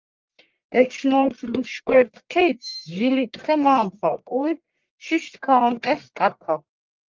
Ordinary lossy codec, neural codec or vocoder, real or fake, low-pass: Opus, 32 kbps; codec, 44.1 kHz, 1.7 kbps, Pupu-Codec; fake; 7.2 kHz